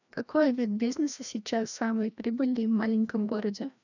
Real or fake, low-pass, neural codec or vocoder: fake; 7.2 kHz; codec, 16 kHz, 1 kbps, FreqCodec, larger model